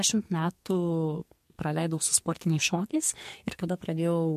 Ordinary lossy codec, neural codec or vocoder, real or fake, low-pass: MP3, 64 kbps; codec, 44.1 kHz, 2.6 kbps, SNAC; fake; 14.4 kHz